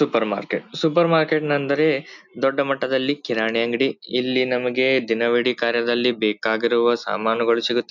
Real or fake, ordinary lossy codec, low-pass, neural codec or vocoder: real; none; 7.2 kHz; none